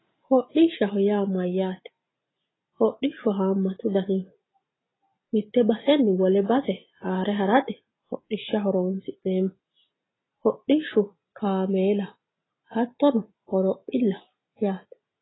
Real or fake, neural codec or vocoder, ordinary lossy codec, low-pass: real; none; AAC, 16 kbps; 7.2 kHz